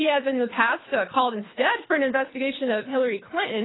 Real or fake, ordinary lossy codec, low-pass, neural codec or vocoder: fake; AAC, 16 kbps; 7.2 kHz; codec, 24 kHz, 3 kbps, HILCodec